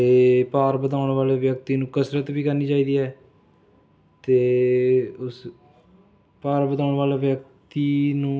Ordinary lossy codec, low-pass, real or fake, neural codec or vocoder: none; none; real; none